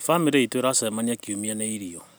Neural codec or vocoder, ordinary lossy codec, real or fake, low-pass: none; none; real; none